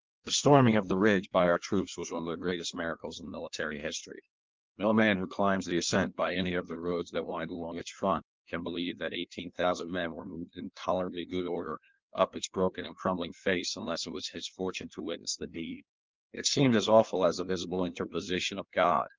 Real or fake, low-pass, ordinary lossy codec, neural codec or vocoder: fake; 7.2 kHz; Opus, 32 kbps; codec, 16 kHz in and 24 kHz out, 1.1 kbps, FireRedTTS-2 codec